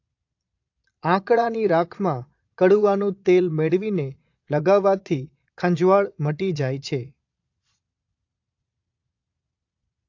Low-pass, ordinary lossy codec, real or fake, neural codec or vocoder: 7.2 kHz; AAC, 48 kbps; fake; vocoder, 22.05 kHz, 80 mel bands, Vocos